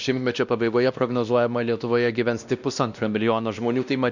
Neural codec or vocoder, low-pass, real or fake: codec, 16 kHz, 1 kbps, X-Codec, WavLM features, trained on Multilingual LibriSpeech; 7.2 kHz; fake